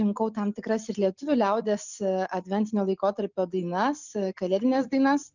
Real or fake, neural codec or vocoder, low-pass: real; none; 7.2 kHz